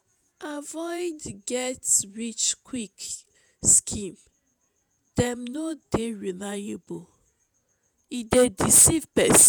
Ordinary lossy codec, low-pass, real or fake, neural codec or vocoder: none; none; fake; vocoder, 48 kHz, 128 mel bands, Vocos